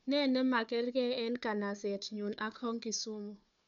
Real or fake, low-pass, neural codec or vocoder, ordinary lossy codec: fake; 7.2 kHz; codec, 16 kHz, 4 kbps, FunCodec, trained on Chinese and English, 50 frames a second; none